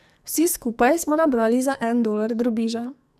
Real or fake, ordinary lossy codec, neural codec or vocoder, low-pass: fake; none; codec, 44.1 kHz, 2.6 kbps, SNAC; 14.4 kHz